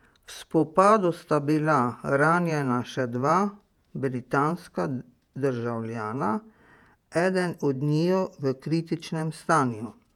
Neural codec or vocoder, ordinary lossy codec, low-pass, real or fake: vocoder, 48 kHz, 128 mel bands, Vocos; none; 19.8 kHz; fake